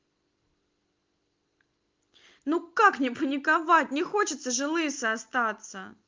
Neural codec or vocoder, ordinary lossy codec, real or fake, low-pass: none; Opus, 32 kbps; real; 7.2 kHz